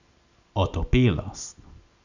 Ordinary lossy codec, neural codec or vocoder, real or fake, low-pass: none; none; real; 7.2 kHz